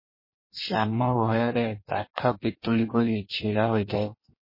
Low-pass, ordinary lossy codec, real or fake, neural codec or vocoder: 5.4 kHz; MP3, 24 kbps; fake; codec, 16 kHz in and 24 kHz out, 1.1 kbps, FireRedTTS-2 codec